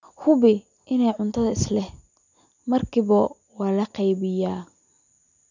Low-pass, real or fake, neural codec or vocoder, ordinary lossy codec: 7.2 kHz; real; none; none